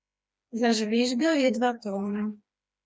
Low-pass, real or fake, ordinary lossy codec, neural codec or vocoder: none; fake; none; codec, 16 kHz, 2 kbps, FreqCodec, smaller model